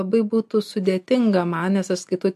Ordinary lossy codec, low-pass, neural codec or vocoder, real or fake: MP3, 64 kbps; 14.4 kHz; none; real